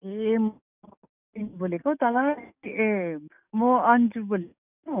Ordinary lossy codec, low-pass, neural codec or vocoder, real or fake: none; 3.6 kHz; codec, 44.1 kHz, 7.8 kbps, DAC; fake